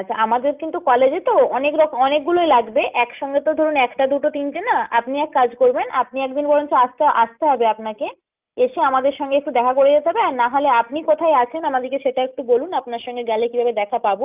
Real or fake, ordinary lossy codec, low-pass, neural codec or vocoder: real; Opus, 24 kbps; 3.6 kHz; none